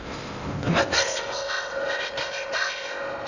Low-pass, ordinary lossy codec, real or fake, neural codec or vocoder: 7.2 kHz; none; fake; codec, 16 kHz in and 24 kHz out, 0.6 kbps, FocalCodec, streaming, 4096 codes